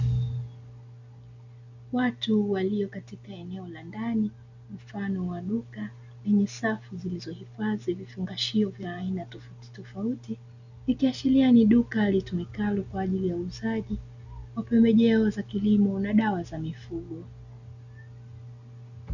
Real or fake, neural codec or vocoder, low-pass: real; none; 7.2 kHz